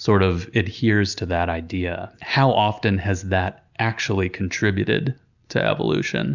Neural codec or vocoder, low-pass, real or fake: none; 7.2 kHz; real